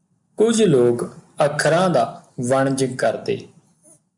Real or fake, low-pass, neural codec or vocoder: real; 10.8 kHz; none